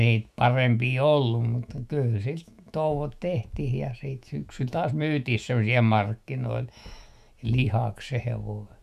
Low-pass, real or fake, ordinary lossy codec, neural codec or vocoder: 14.4 kHz; fake; none; autoencoder, 48 kHz, 128 numbers a frame, DAC-VAE, trained on Japanese speech